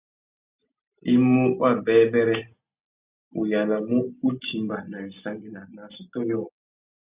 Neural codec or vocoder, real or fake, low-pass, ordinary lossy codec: none; real; 3.6 kHz; Opus, 64 kbps